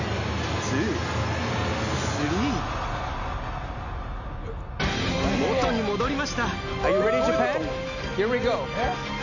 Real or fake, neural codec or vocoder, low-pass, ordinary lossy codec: real; none; 7.2 kHz; none